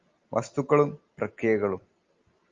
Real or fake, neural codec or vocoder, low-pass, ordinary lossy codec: real; none; 7.2 kHz; Opus, 32 kbps